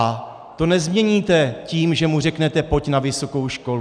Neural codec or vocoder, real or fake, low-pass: none; real; 9.9 kHz